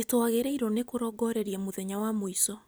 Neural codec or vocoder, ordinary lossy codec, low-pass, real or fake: none; none; none; real